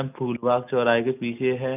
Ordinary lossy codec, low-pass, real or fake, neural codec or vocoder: none; 3.6 kHz; real; none